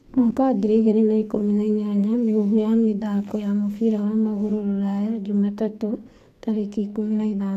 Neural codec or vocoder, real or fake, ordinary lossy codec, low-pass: codec, 44.1 kHz, 2.6 kbps, SNAC; fake; none; 14.4 kHz